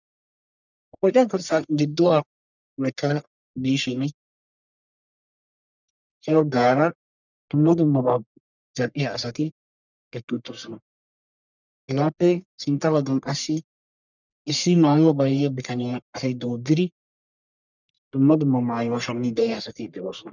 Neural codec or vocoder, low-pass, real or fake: codec, 44.1 kHz, 1.7 kbps, Pupu-Codec; 7.2 kHz; fake